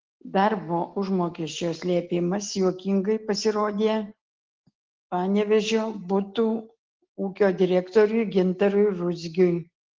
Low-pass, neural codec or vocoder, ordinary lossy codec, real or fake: 7.2 kHz; none; Opus, 16 kbps; real